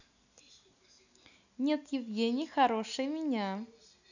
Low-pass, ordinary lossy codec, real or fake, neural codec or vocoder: 7.2 kHz; none; real; none